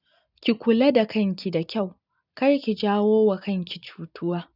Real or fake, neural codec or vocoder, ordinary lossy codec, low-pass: real; none; none; 5.4 kHz